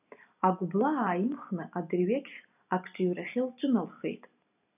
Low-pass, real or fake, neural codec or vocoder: 3.6 kHz; real; none